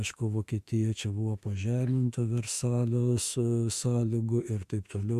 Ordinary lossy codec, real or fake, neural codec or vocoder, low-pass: Opus, 64 kbps; fake; autoencoder, 48 kHz, 32 numbers a frame, DAC-VAE, trained on Japanese speech; 14.4 kHz